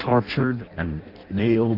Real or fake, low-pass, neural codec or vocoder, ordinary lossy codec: fake; 5.4 kHz; codec, 16 kHz in and 24 kHz out, 0.6 kbps, FireRedTTS-2 codec; Opus, 64 kbps